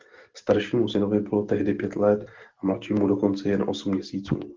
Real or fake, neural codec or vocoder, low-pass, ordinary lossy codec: real; none; 7.2 kHz; Opus, 16 kbps